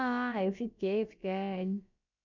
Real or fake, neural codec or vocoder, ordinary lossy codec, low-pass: fake; codec, 16 kHz, about 1 kbps, DyCAST, with the encoder's durations; none; 7.2 kHz